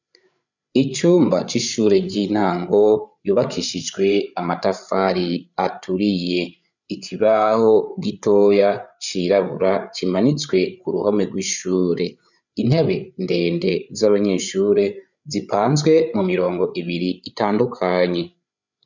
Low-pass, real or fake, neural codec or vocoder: 7.2 kHz; fake; codec, 16 kHz, 8 kbps, FreqCodec, larger model